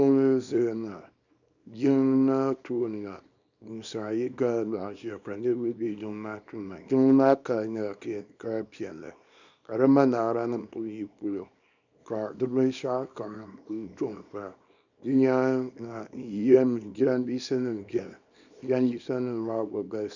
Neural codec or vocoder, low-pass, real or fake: codec, 24 kHz, 0.9 kbps, WavTokenizer, small release; 7.2 kHz; fake